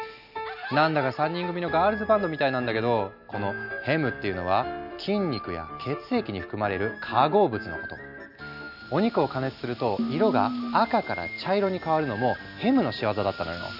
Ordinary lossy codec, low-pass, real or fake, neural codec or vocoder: none; 5.4 kHz; real; none